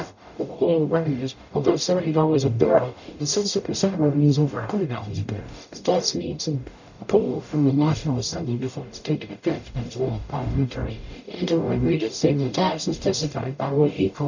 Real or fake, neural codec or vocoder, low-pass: fake; codec, 44.1 kHz, 0.9 kbps, DAC; 7.2 kHz